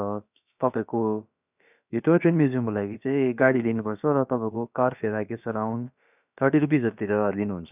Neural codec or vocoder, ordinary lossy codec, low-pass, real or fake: codec, 16 kHz, about 1 kbps, DyCAST, with the encoder's durations; none; 3.6 kHz; fake